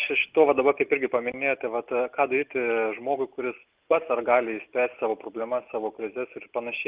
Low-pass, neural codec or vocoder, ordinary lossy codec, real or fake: 3.6 kHz; none; Opus, 32 kbps; real